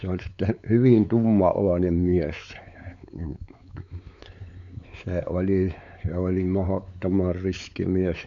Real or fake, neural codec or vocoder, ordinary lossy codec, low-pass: fake; codec, 16 kHz, 4 kbps, X-Codec, WavLM features, trained on Multilingual LibriSpeech; none; 7.2 kHz